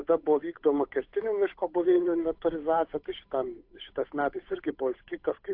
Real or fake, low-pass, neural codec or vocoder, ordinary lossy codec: fake; 5.4 kHz; codec, 16 kHz, 8 kbps, FunCodec, trained on Chinese and English, 25 frames a second; AAC, 32 kbps